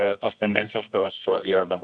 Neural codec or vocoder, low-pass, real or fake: codec, 24 kHz, 0.9 kbps, WavTokenizer, medium music audio release; 9.9 kHz; fake